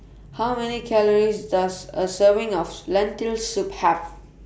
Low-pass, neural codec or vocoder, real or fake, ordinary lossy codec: none; none; real; none